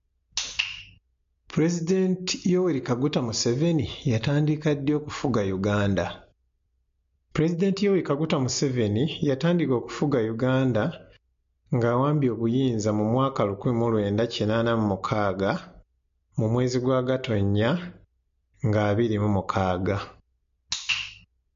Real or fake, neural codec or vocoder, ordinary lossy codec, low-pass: real; none; MP3, 48 kbps; 7.2 kHz